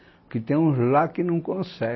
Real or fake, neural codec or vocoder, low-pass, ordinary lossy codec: real; none; 7.2 kHz; MP3, 24 kbps